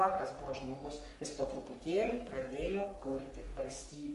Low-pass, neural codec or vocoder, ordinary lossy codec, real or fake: 14.4 kHz; codec, 44.1 kHz, 3.4 kbps, Pupu-Codec; MP3, 48 kbps; fake